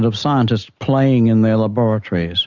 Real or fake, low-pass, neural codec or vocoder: real; 7.2 kHz; none